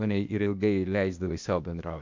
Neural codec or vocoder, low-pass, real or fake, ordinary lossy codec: codec, 16 kHz, 0.8 kbps, ZipCodec; 7.2 kHz; fake; MP3, 64 kbps